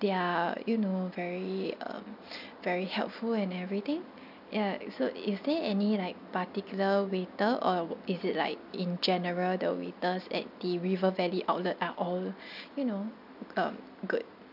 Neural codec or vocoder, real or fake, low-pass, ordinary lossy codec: none; real; 5.4 kHz; none